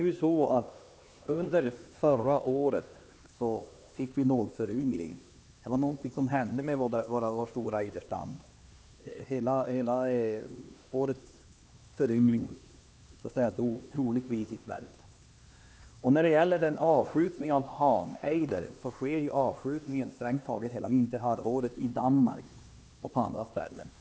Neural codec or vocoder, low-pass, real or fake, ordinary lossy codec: codec, 16 kHz, 2 kbps, X-Codec, HuBERT features, trained on LibriSpeech; none; fake; none